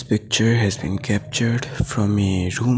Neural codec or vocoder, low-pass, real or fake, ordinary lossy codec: none; none; real; none